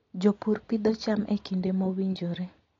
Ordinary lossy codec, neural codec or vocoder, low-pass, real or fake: AAC, 32 kbps; none; 7.2 kHz; real